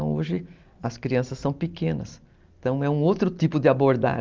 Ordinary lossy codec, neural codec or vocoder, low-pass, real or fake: Opus, 32 kbps; none; 7.2 kHz; real